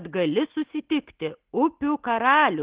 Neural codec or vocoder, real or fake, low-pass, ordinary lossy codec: none; real; 3.6 kHz; Opus, 16 kbps